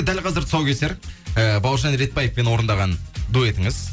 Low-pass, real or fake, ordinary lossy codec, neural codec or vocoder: none; real; none; none